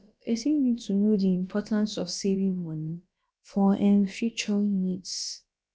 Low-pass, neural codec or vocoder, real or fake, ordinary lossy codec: none; codec, 16 kHz, about 1 kbps, DyCAST, with the encoder's durations; fake; none